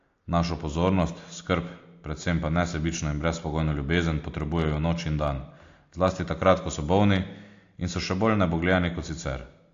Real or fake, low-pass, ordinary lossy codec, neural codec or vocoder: real; 7.2 kHz; AAC, 48 kbps; none